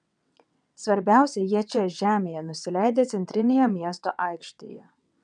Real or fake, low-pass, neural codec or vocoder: fake; 9.9 kHz; vocoder, 22.05 kHz, 80 mel bands, WaveNeXt